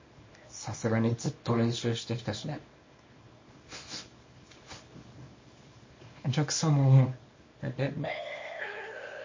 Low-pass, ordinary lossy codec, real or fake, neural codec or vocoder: 7.2 kHz; MP3, 32 kbps; fake; codec, 24 kHz, 0.9 kbps, WavTokenizer, small release